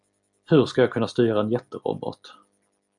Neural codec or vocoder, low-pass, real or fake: none; 10.8 kHz; real